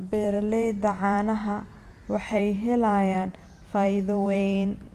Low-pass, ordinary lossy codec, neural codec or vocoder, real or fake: 14.4 kHz; Opus, 32 kbps; vocoder, 44.1 kHz, 128 mel bands every 512 samples, BigVGAN v2; fake